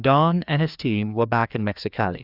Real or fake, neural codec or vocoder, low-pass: fake; codec, 16 kHz, 2 kbps, FreqCodec, larger model; 5.4 kHz